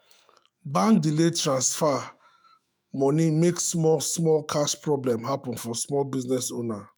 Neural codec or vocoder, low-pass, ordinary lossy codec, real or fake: autoencoder, 48 kHz, 128 numbers a frame, DAC-VAE, trained on Japanese speech; none; none; fake